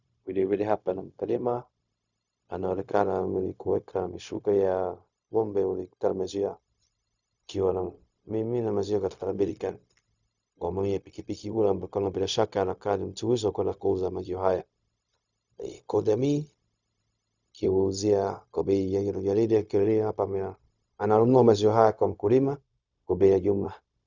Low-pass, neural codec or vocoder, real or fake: 7.2 kHz; codec, 16 kHz, 0.4 kbps, LongCat-Audio-Codec; fake